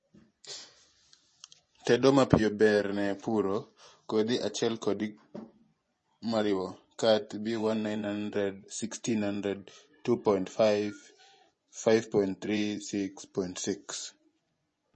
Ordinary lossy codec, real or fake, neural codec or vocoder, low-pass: MP3, 32 kbps; fake; vocoder, 24 kHz, 100 mel bands, Vocos; 10.8 kHz